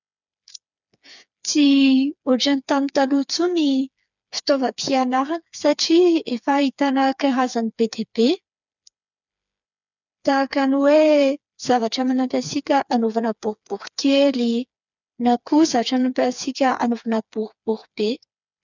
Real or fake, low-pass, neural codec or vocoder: fake; 7.2 kHz; codec, 16 kHz, 4 kbps, FreqCodec, smaller model